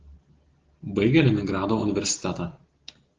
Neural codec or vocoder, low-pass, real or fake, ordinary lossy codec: none; 7.2 kHz; real; Opus, 16 kbps